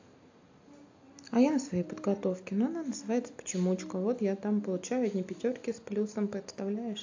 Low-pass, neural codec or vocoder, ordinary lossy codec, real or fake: 7.2 kHz; none; AAC, 48 kbps; real